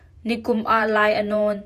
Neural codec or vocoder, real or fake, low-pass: vocoder, 48 kHz, 128 mel bands, Vocos; fake; 14.4 kHz